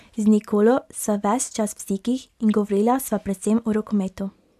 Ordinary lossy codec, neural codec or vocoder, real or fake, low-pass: AAC, 96 kbps; none; real; 14.4 kHz